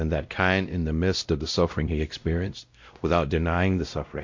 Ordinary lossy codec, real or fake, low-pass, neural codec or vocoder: MP3, 48 kbps; fake; 7.2 kHz; codec, 16 kHz, 0.5 kbps, X-Codec, WavLM features, trained on Multilingual LibriSpeech